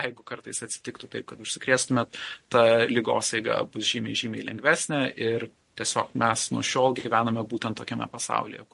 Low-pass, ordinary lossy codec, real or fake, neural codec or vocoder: 14.4 kHz; MP3, 48 kbps; fake; vocoder, 44.1 kHz, 128 mel bands every 512 samples, BigVGAN v2